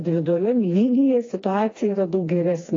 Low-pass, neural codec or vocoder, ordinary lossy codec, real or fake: 7.2 kHz; codec, 16 kHz, 2 kbps, FreqCodec, smaller model; AAC, 32 kbps; fake